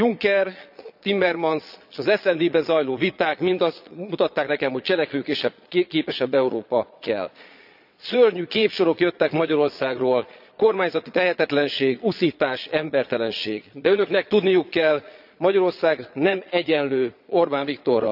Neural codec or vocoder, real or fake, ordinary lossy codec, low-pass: vocoder, 22.05 kHz, 80 mel bands, Vocos; fake; none; 5.4 kHz